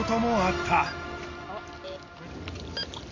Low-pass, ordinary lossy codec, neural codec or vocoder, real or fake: 7.2 kHz; MP3, 48 kbps; none; real